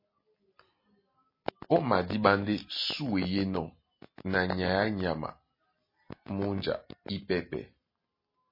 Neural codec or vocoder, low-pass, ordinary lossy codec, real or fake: none; 5.4 kHz; MP3, 24 kbps; real